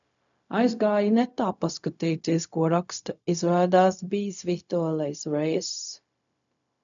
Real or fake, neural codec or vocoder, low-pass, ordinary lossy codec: fake; codec, 16 kHz, 0.4 kbps, LongCat-Audio-Codec; 7.2 kHz; MP3, 96 kbps